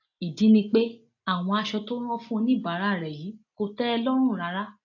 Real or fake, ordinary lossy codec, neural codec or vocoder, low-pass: real; AAC, 48 kbps; none; 7.2 kHz